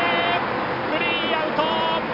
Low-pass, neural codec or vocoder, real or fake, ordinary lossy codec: 5.4 kHz; none; real; none